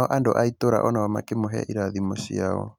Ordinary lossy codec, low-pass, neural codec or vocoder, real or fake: none; 19.8 kHz; none; real